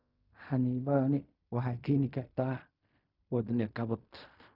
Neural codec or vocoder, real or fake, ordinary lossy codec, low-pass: codec, 16 kHz in and 24 kHz out, 0.4 kbps, LongCat-Audio-Codec, fine tuned four codebook decoder; fake; none; 5.4 kHz